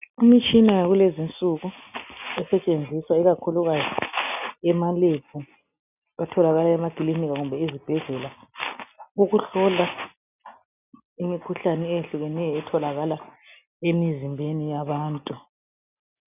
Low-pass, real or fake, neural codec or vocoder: 3.6 kHz; real; none